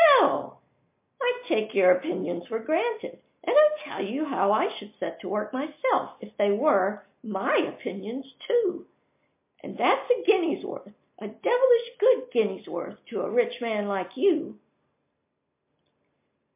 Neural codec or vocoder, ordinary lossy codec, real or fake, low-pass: none; MP3, 24 kbps; real; 3.6 kHz